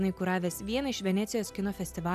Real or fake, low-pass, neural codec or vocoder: real; 14.4 kHz; none